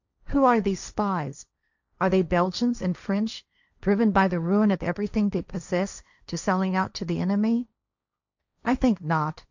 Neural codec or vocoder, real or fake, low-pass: codec, 16 kHz, 1.1 kbps, Voila-Tokenizer; fake; 7.2 kHz